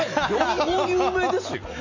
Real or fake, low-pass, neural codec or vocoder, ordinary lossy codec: real; 7.2 kHz; none; MP3, 64 kbps